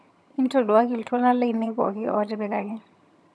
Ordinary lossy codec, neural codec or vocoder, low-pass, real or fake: none; vocoder, 22.05 kHz, 80 mel bands, HiFi-GAN; none; fake